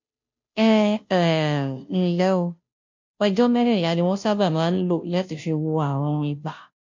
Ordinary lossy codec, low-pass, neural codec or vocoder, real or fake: MP3, 48 kbps; 7.2 kHz; codec, 16 kHz, 0.5 kbps, FunCodec, trained on Chinese and English, 25 frames a second; fake